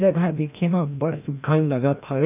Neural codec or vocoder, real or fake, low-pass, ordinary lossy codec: codec, 16 kHz, 1 kbps, FreqCodec, larger model; fake; 3.6 kHz; none